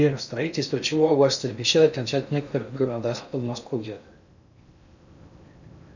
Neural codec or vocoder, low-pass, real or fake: codec, 16 kHz in and 24 kHz out, 0.6 kbps, FocalCodec, streaming, 4096 codes; 7.2 kHz; fake